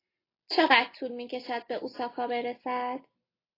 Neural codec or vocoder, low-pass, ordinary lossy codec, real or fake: none; 5.4 kHz; AAC, 24 kbps; real